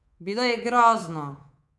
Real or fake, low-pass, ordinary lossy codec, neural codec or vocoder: fake; none; none; codec, 24 kHz, 3.1 kbps, DualCodec